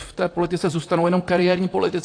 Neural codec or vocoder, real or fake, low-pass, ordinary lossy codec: vocoder, 44.1 kHz, 128 mel bands, Pupu-Vocoder; fake; 9.9 kHz; AAC, 48 kbps